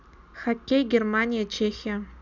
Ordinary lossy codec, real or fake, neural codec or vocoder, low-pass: none; real; none; 7.2 kHz